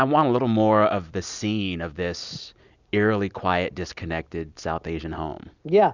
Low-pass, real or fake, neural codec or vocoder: 7.2 kHz; real; none